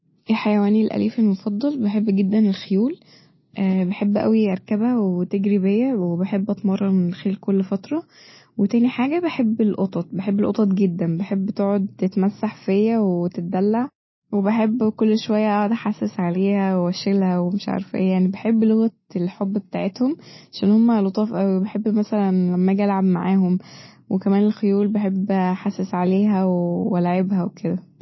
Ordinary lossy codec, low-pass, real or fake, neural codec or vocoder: MP3, 24 kbps; 7.2 kHz; real; none